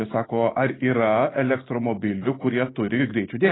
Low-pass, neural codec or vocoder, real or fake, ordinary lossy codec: 7.2 kHz; none; real; AAC, 16 kbps